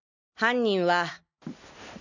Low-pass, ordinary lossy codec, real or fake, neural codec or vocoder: 7.2 kHz; none; real; none